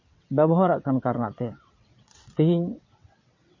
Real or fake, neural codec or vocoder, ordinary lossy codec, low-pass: fake; vocoder, 22.05 kHz, 80 mel bands, WaveNeXt; MP3, 32 kbps; 7.2 kHz